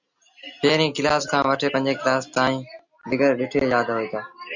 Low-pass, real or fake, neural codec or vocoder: 7.2 kHz; real; none